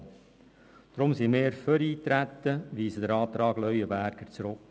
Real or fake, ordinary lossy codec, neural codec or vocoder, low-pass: real; none; none; none